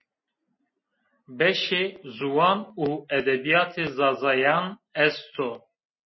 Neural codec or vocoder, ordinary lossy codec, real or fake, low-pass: none; MP3, 24 kbps; real; 7.2 kHz